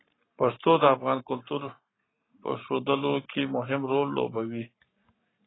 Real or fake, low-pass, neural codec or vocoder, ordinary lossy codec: fake; 7.2 kHz; codec, 44.1 kHz, 7.8 kbps, Pupu-Codec; AAC, 16 kbps